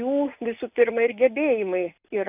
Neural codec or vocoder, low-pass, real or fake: none; 3.6 kHz; real